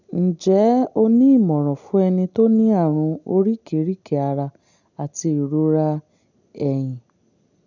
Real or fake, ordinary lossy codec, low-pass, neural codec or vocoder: real; none; 7.2 kHz; none